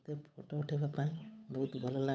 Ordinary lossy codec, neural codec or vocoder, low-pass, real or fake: none; codec, 16 kHz, 8 kbps, FunCodec, trained on Chinese and English, 25 frames a second; none; fake